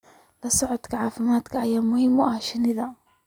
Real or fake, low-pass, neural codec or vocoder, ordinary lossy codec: real; 19.8 kHz; none; none